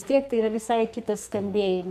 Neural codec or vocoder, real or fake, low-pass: codec, 44.1 kHz, 2.6 kbps, SNAC; fake; 14.4 kHz